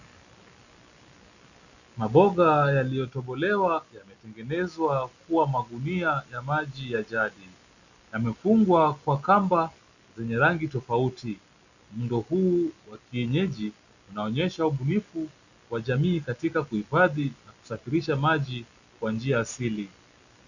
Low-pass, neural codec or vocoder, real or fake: 7.2 kHz; none; real